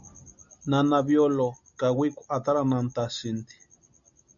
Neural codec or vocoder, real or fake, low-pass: none; real; 7.2 kHz